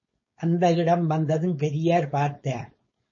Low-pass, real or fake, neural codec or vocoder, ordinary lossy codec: 7.2 kHz; fake; codec, 16 kHz, 4.8 kbps, FACodec; MP3, 32 kbps